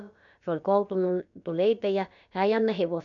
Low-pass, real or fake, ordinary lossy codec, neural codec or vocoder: 7.2 kHz; fake; none; codec, 16 kHz, about 1 kbps, DyCAST, with the encoder's durations